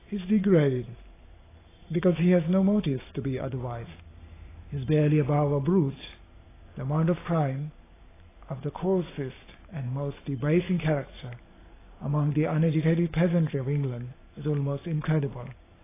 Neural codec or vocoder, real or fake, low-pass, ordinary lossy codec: vocoder, 44.1 kHz, 128 mel bands every 512 samples, BigVGAN v2; fake; 3.6 kHz; AAC, 16 kbps